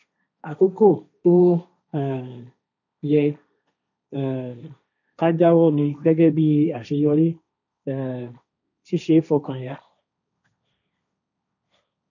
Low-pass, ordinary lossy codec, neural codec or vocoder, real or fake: 7.2 kHz; none; codec, 16 kHz, 1.1 kbps, Voila-Tokenizer; fake